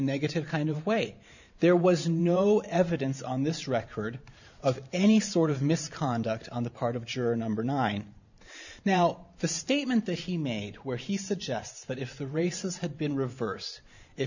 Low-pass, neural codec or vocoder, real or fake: 7.2 kHz; vocoder, 44.1 kHz, 128 mel bands every 512 samples, BigVGAN v2; fake